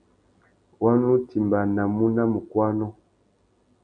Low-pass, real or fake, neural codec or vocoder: 9.9 kHz; real; none